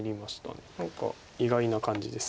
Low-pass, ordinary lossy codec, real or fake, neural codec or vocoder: none; none; real; none